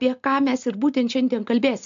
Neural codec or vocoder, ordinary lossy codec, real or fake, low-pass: none; MP3, 64 kbps; real; 7.2 kHz